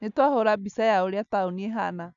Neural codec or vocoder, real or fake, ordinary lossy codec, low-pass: none; real; none; 7.2 kHz